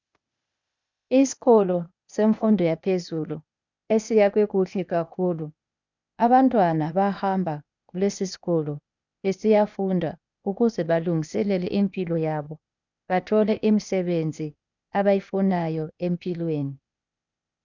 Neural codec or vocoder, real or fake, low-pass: codec, 16 kHz, 0.8 kbps, ZipCodec; fake; 7.2 kHz